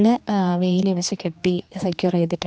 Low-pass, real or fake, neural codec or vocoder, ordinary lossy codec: none; fake; codec, 16 kHz, 2 kbps, X-Codec, HuBERT features, trained on general audio; none